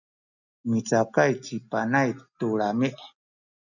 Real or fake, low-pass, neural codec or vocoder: real; 7.2 kHz; none